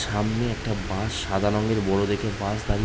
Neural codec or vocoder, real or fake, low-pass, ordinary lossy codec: none; real; none; none